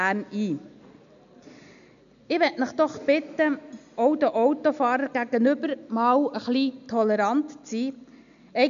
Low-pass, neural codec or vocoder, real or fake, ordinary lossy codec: 7.2 kHz; none; real; none